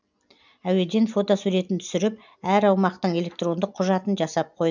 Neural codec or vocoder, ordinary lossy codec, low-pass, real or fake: none; none; 7.2 kHz; real